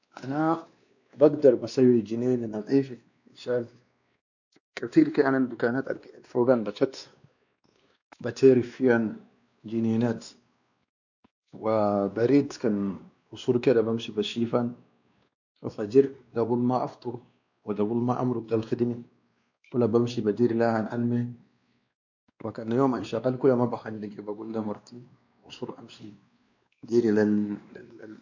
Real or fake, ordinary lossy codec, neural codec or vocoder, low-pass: fake; none; codec, 16 kHz, 2 kbps, X-Codec, WavLM features, trained on Multilingual LibriSpeech; 7.2 kHz